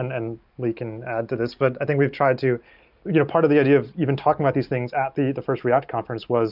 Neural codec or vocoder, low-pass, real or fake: none; 5.4 kHz; real